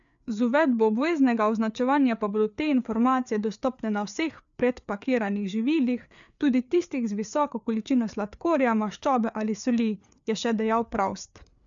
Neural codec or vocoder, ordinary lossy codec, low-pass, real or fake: codec, 16 kHz, 4 kbps, FreqCodec, larger model; none; 7.2 kHz; fake